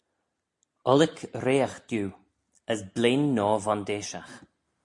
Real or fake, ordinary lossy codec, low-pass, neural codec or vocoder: real; MP3, 64 kbps; 10.8 kHz; none